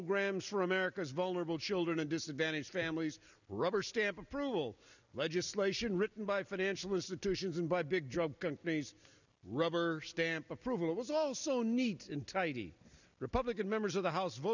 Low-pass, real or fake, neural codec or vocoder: 7.2 kHz; real; none